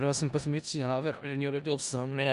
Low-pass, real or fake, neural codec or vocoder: 10.8 kHz; fake; codec, 16 kHz in and 24 kHz out, 0.4 kbps, LongCat-Audio-Codec, four codebook decoder